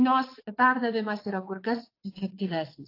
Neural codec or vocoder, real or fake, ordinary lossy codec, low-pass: vocoder, 22.05 kHz, 80 mel bands, WaveNeXt; fake; AAC, 32 kbps; 5.4 kHz